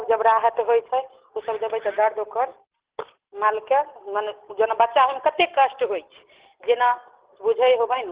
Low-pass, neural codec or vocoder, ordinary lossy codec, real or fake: 3.6 kHz; none; Opus, 16 kbps; real